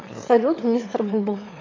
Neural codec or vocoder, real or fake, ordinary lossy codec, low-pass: autoencoder, 22.05 kHz, a latent of 192 numbers a frame, VITS, trained on one speaker; fake; AAC, 32 kbps; 7.2 kHz